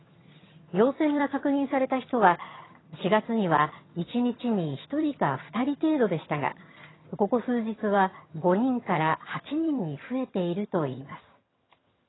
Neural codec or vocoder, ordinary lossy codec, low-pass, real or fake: vocoder, 22.05 kHz, 80 mel bands, HiFi-GAN; AAC, 16 kbps; 7.2 kHz; fake